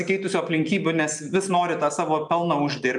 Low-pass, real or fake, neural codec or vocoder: 10.8 kHz; real; none